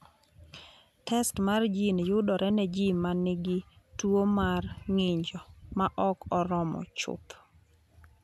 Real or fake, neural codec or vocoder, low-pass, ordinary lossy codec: real; none; 14.4 kHz; none